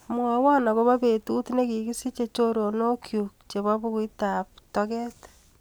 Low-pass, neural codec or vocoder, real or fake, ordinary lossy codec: none; none; real; none